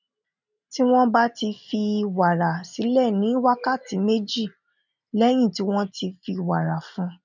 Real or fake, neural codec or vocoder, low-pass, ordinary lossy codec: real; none; 7.2 kHz; none